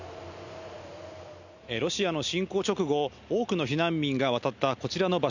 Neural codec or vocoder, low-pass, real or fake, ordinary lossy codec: none; 7.2 kHz; real; none